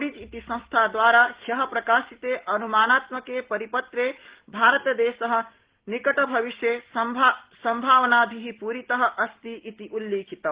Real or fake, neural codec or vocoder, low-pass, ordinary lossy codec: real; none; 3.6 kHz; Opus, 16 kbps